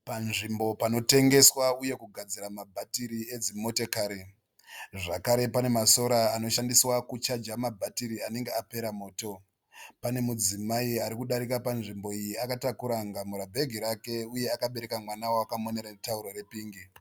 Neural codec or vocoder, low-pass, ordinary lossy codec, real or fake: none; 19.8 kHz; Opus, 64 kbps; real